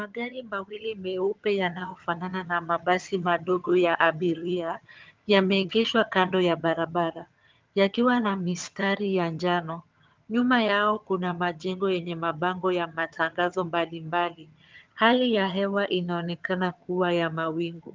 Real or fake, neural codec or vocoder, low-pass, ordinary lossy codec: fake; vocoder, 22.05 kHz, 80 mel bands, HiFi-GAN; 7.2 kHz; Opus, 24 kbps